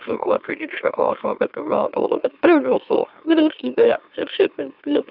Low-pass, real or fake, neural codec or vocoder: 5.4 kHz; fake; autoencoder, 44.1 kHz, a latent of 192 numbers a frame, MeloTTS